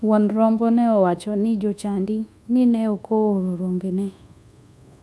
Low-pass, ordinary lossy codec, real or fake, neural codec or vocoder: none; none; fake; codec, 24 kHz, 1.2 kbps, DualCodec